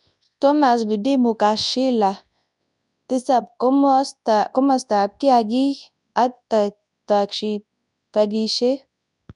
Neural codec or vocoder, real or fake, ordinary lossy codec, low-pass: codec, 24 kHz, 0.9 kbps, WavTokenizer, large speech release; fake; none; 10.8 kHz